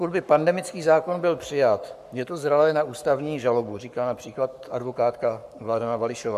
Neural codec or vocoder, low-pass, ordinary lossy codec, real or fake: codec, 44.1 kHz, 7.8 kbps, Pupu-Codec; 14.4 kHz; AAC, 96 kbps; fake